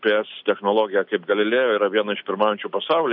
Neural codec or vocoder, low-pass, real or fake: none; 5.4 kHz; real